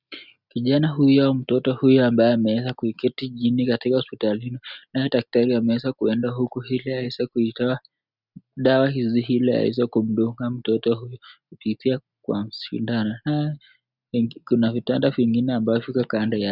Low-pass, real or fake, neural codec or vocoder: 5.4 kHz; real; none